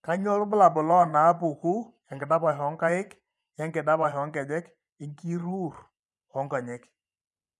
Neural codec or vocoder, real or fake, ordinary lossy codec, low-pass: vocoder, 24 kHz, 100 mel bands, Vocos; fake; none; none